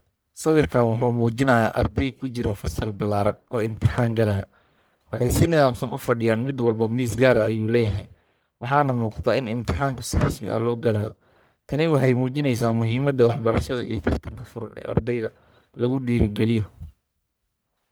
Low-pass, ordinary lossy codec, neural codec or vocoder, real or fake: none; none; codec, 44.1 kHz, 1.7 kbps, Pupu-Codec; fake